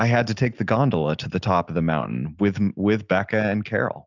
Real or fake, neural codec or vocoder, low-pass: real; none; 7.2 kHz